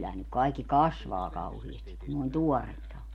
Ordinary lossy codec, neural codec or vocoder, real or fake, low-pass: MP3, 64 kbps; none; real; 19.8 kHz